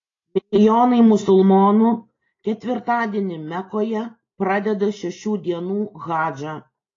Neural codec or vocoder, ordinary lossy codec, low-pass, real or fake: none; AAC, 32 kbps; 7.2 kHz; real